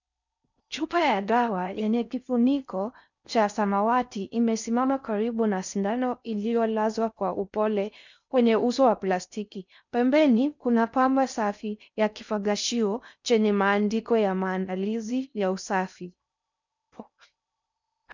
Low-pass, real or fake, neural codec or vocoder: 7.2 kHz; fake; codec, 16 kHz in and 24 kHz out, 0.6 kbps, FocalCodec, streaming, 4096 codes